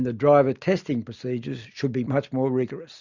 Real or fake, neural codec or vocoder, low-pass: real; none; 7.2 kHz